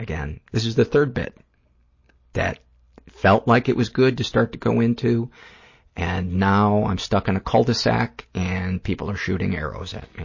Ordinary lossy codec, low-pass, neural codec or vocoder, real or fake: MP3, 32 kbps; 7.2 kHz; none; real